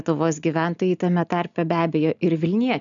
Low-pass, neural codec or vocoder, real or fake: 7.2 kHz; none; real